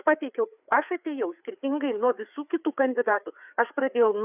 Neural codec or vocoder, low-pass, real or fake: codec, 16 kHz, 4 kbps, FreqCodec, larger model; 3.6 kHz; fake